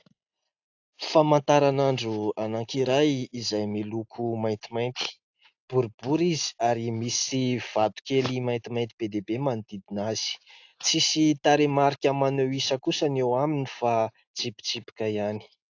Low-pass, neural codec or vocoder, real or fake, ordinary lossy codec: 7.2 kHz; none; real; AAC, 48 kbps